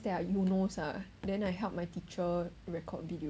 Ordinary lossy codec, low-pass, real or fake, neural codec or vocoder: none; none; real; none